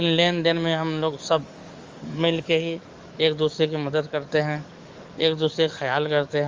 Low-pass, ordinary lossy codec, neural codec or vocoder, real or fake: 7.2 kHz; Opus, 32 kbps; codec, 44.1 kHz, 7.8 kbps, DAC; fake